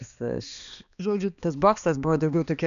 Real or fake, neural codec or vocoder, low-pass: fake; codec, 16 kHz, 2 kbps, X-Codec, HuBERT features, trained on balanced general audio; 7.2 kHz